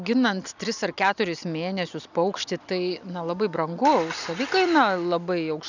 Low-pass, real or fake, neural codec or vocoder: 7.2 kHz; real; none